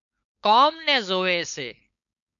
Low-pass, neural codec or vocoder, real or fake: 7.2 kHz; codec, 16 kHz, 4.8 kbps, FACodec; fake